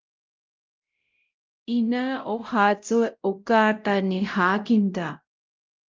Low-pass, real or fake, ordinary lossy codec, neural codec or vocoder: 7.2 kHz; fake; Opus, 24 kbps; codec, 16 kHz, 0.5 kbps, X-Codec, WavLM features, trained on Multilingual LibriSpeech